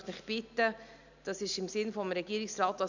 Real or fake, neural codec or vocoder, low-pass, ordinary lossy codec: real; none; 7.2 kHz; none